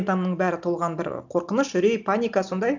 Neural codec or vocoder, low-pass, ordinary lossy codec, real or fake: none; 7.2 kHz; none; real